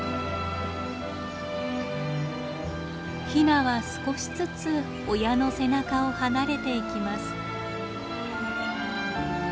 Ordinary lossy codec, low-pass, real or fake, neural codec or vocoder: none; none; real; none